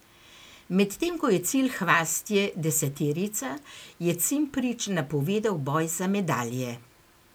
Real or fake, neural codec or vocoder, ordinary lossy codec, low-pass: real; none; none; none